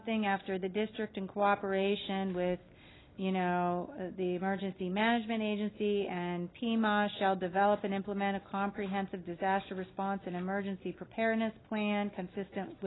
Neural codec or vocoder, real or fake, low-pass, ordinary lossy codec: none; real; 7.2 kHz; AAC, 16 kbps